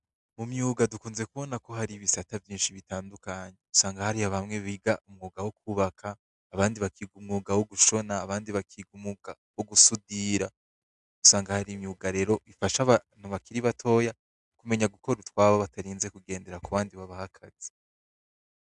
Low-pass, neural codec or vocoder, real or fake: 10.8 kHz; none; real